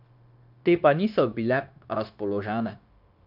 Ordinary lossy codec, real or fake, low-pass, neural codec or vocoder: none; fake; 5.4 kHz; codec, 16 kHz, 0.9 kbps, LongCat-Audio-Codec